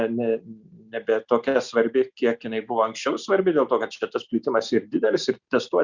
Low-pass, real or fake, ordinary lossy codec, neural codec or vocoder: 7.2 kHz; real; Opus, 64 kbps; none